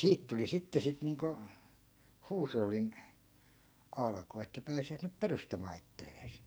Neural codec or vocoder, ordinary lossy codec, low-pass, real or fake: codec, 44.1 kHz, 2.6 kbps, SNAC; none; none; fake